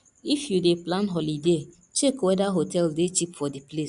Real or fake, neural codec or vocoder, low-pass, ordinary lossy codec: real; none; 10.8 kHz; none